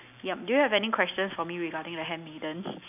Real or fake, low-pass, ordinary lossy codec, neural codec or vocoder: real; 3.6 kHz; none; none